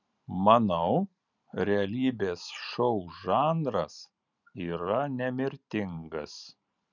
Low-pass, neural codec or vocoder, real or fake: 7.2 kHz; vocoder, 44.1 kHz, 128 mel bands every 512 samples, BigVGAN v2; fake